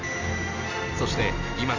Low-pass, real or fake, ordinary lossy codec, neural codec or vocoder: 7.2 kHz; real; none; none